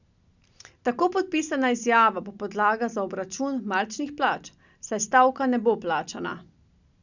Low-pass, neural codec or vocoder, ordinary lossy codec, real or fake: 7.2 kHz; none; none; real